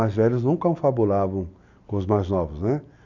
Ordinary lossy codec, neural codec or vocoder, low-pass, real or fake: none; none; 7.2 kHz; real